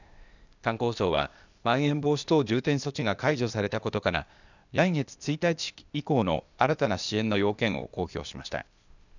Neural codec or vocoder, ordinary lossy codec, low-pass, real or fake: codec, 16 kHz, 0.8 kbps, ZipCodec; none; 7.2 kHz; fake